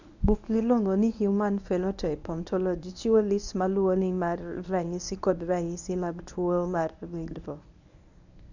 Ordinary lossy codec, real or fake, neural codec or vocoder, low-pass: none; fake; codec, 24 kHz, 0.9 kbps, WavTokenizer, medium speech release version 1; 7.2 kHz